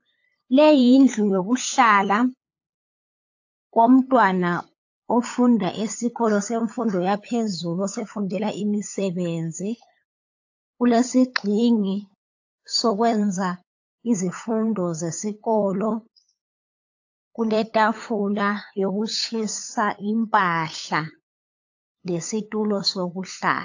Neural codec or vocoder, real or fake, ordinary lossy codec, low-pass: codec, 16 kHz, 8 kbps, FunCodec, trained on LibriTTS, 25 frames a second; fake; AAC, 48 kbps; 7.2 kHz